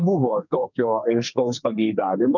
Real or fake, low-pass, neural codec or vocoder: fake; 7.2 kHz; codec, 32 kHz, 1.9 kbps, SNAC